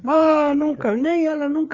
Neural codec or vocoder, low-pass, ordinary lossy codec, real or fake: codec, 16 kHz, 4 kbps, FreqCodec, larger model; 7.2 kHz; MP3, 64 kbps; fake